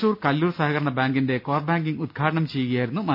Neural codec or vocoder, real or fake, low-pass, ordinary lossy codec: none; real; 5.4 kHz; none